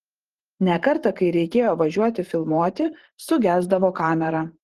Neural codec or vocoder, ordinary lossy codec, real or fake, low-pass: vocoder, 44.1 kHz, 128 mel bands every 512 samples, BigVGAN v2; Opus, 16 kbps; fake; 14.4 kHz